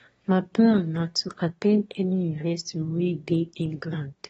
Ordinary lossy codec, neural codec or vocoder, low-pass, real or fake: AAC, 24 kbps; autoencoder, 22.05 kHz, a latent of 192 numbers a frame, VITS, trained on one speaker; 9.9 kHz; fake